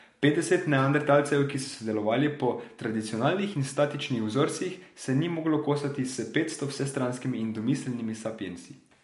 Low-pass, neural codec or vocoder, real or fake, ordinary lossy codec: 14.4 kHz; none; real; MP3, 48 kbps